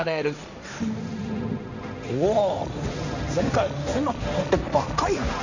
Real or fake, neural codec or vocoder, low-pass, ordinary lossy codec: fake; codec, 16 kHz, 1.1 kbps, Voila-Tokenizer; 7.2 kHz; none